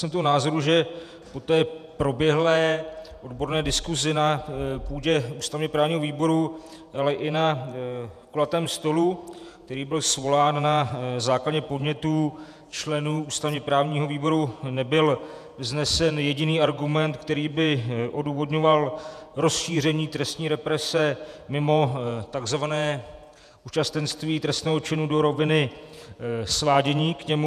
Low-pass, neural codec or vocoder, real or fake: 14.4 kHz; vocoder, 48 kHz, 128 mel bands, Vocos; fake